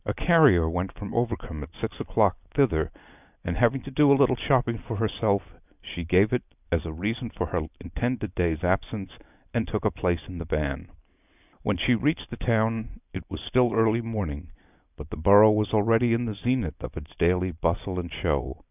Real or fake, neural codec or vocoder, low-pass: real; none; 3.6 kHz